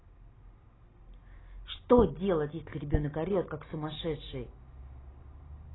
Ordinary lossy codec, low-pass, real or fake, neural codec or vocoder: AAC, 16 kbps; 7.2 kHz; real; none